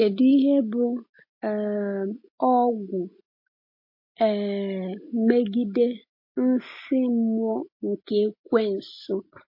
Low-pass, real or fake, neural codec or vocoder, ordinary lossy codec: 5.4 kHz; real; none; MP3, 32 kbps